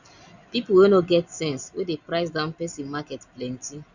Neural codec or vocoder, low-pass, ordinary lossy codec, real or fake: none; 7.2 kHz; none; real